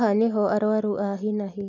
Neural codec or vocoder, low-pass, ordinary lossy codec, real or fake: none; 7.2 kHz; none; real